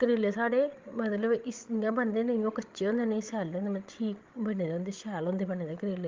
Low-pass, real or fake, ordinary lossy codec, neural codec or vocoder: 7.2 kHz; fake; Opus, 32 kbps; codec, 16 kHz, 16 kbps, FreqCodec, larger model